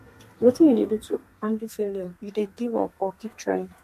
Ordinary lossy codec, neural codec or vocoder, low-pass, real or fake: MP3, 64 kbps; codec, 32 kHz, 1.9 kbps, SNAC; 14.4 kHz; fake